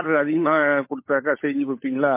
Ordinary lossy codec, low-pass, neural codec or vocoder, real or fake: MP3, 24 kbps; 3.6 kHz; codec, 16 kHz, 16 kbps, FunCodec, trained on LibriTTS, 50 frames a second; fake